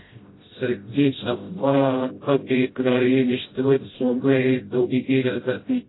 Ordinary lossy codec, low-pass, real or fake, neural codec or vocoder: AAC, 16 kbps; 7.2 kHz; fake; codec, 16 kHz, 0.5 kbps, FreqCodec, smaller model